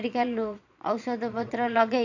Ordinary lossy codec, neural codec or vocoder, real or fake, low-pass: none; vocoder, 22.05 kHz, 80 mel bands, Vocos; fake; 7.2 kHz